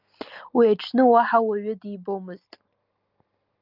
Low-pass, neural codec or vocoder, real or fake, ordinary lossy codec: 5.4 kHz; none; real; Opus, 32 kbps